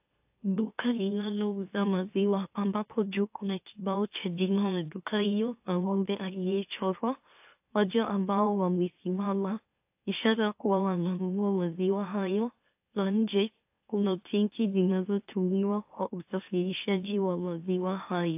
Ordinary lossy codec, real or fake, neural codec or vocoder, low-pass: AAC, 32 kbps; fake; autoencoder, 44.1 kHz, a latent of 192 numbers a frame, MeloTTS; 3.6 kHz